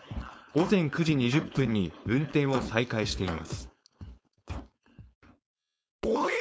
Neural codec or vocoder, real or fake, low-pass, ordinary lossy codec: codec, 16 kHz, 4.8 kbps, FACodec; fake; none; none